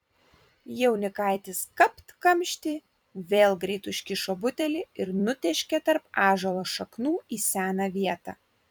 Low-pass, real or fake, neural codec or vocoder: 19.8 kHz; fake; vocoder, 44.1 kHz, 128 mel bands every 256 samples, BigVGAN v2